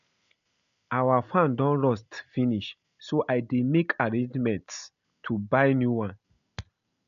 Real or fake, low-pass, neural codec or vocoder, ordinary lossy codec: real; 7.2 kHz; none; none